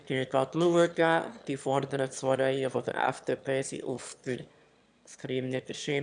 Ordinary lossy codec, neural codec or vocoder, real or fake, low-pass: none; autoencoder, 22.05 kHz, a latent of 192 numbers a frame, VITS, trained on one speaker; fake; 9.9 kHz